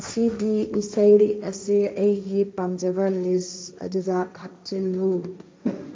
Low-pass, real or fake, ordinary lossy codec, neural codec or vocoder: none; fake; none; codec, 16 kHz, 1.1 kbps, Voila-Tokenizer